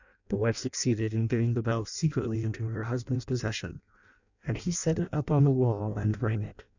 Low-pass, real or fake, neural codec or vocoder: 7.2 kHz; fake; codec, 16 kHz in and 24 kHz out, 0.6 kbps, FireRedTTS-2 codec